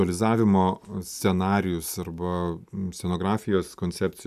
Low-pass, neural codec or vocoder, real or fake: 14.4 kHz; none; real